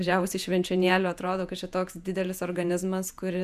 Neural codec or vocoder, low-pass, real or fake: vocoder, 48 kHz, 128 mel bands, Vocos; 14.4 kHz; fake